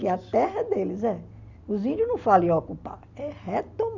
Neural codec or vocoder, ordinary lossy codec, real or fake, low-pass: none; none; real; 7.2 kHz